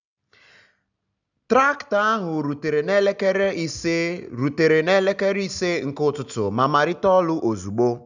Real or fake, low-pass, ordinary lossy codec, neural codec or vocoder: real; 7.2 kHz; none; none